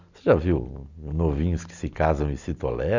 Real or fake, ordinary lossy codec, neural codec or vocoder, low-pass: real; none; none; 7.2 kHz